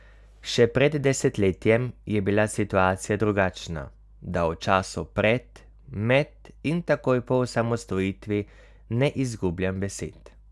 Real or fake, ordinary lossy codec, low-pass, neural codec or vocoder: real; none; none; none